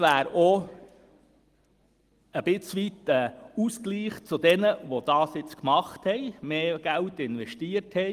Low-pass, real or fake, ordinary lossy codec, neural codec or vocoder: 14.4 kHz; real; Opus, 24 kbps; none